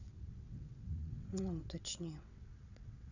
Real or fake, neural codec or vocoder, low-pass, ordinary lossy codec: fake; vocoder, 22.05 kHz, 80 mel bands, WaveNeXt; 7.2 kHz; none